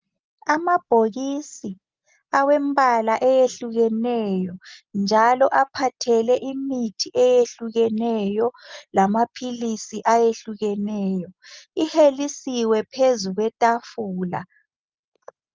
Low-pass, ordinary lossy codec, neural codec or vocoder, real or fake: 7.2 kHz; Opus, 32 kbps; none; real